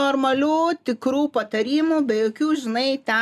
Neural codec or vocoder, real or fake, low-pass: none; real; 14.4 kHz